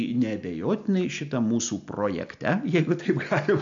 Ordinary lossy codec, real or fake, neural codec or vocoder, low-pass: AAC, 64 kbps; real; none; 7.2 kHz